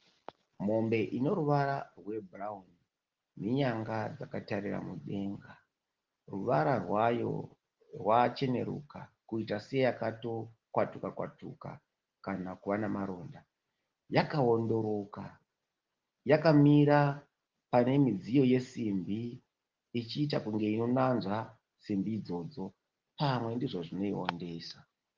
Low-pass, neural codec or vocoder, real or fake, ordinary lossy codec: 7.2 kHz; none; real; Opus, 16 kbps